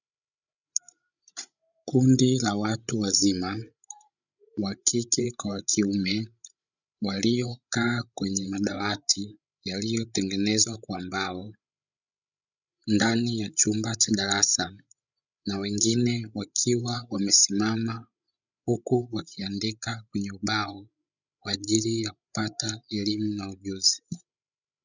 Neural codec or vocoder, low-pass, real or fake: codec, 16 kHz, 16 kbps, FreqCodec, larger model; 7.2 kHz; fake